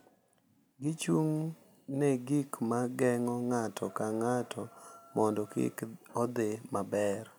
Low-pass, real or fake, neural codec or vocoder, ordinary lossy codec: none; real; none; none